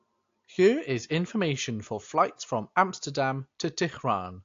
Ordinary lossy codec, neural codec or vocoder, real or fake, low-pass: AAC, 48 kbps; none; real; 7.2 kHz